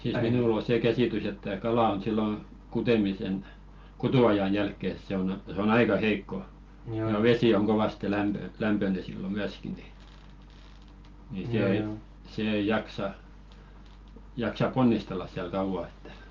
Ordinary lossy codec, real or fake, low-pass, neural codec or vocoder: Opus, 32 kbps; real; 7.2 kHz; none